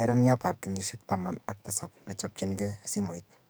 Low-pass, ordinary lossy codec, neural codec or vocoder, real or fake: none; none; codec, 44.1 kHz, 2.6 kbps, SNAC; fake